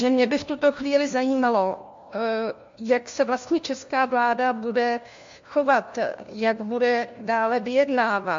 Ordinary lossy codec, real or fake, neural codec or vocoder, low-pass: MP3, 48 kbps; fake; codec, 16 kHz, 1 kbps, FunCodec, trained on LibriTTS, 50 frames a second; 7.2 kHz